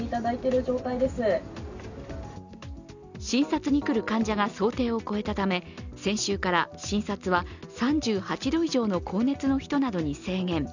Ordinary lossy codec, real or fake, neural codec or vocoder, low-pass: none; real; none; 7.2 kHz